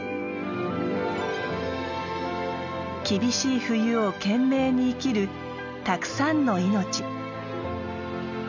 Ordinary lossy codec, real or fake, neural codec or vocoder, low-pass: none; real; none; 7.2 kHz